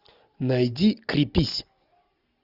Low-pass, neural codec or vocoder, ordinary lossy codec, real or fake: 5.4 kHz; none; Opus, 64 kbps; real